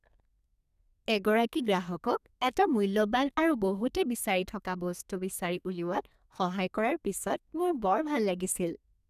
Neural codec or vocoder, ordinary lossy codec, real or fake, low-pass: codec, 32 kHz, 1.9 kbps, SNAC; none; fake; 14.4 kHz